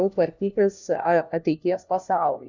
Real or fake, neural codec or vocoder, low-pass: fake; codec, 16 kHz, 1 kbps, FunCodec, trained on LibriTTS, 50 frames a second; 7.2 kHz